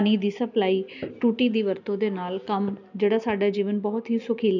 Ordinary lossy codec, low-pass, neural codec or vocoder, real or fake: none; 7.2 kHz; none; real